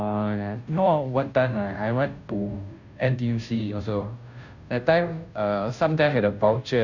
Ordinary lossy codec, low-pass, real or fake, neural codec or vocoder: none; 7.2 kHz; fake; codec, 16 kHz, 0.5 kbps, FunCodec, trained on Chinese and English, 25 frames a second